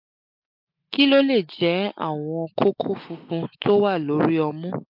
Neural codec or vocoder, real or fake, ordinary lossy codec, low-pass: none; real; MP3, 48 kbps; 5.4 kHz